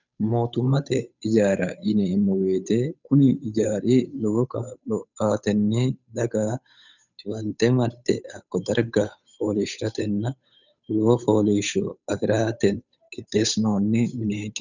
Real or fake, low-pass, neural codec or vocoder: fake; 7.2 kHz; codec, 16 kHz, 8 kbps, FunCodec, trained on Chinese and English, 25 frames a second